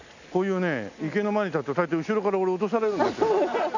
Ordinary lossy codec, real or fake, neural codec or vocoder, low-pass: none; real; none; 7.2 kHz